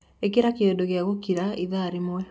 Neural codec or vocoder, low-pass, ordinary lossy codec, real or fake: none; none; none; real